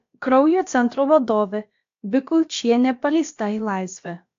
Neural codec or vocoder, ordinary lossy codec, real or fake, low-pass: codec, 16 kHz, about 1 kbps, DyCAST, with the encoder's durations; AAC, 48 kbps; fake; 7.2 kHz